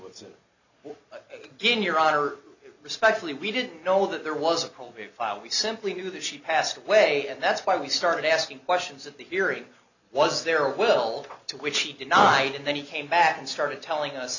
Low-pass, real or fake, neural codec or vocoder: 7.2 kHz; real; none